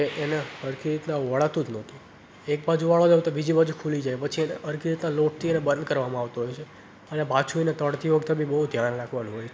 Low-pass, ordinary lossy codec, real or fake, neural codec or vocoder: none; none; real; none